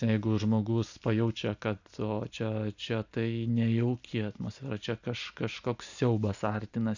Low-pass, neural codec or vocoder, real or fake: 7.2 kHz; none; real